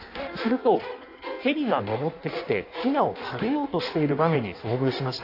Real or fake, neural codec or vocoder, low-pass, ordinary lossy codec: fake; codec, 16 kHz in and 24 kHz out, 1.1 kbps, FireRedTTS-2 codec; 5.4 kHz; AAC, 32 kbps